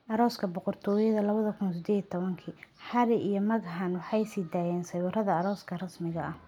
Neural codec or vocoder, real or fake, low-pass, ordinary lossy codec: none; real; 19.8 kHz; none